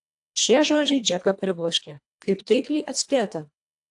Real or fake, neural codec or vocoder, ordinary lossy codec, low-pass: fake; codec, 24 kHz, 1.5 kbps, HILCodec; AAC, 64 kbps; 10.8 kHz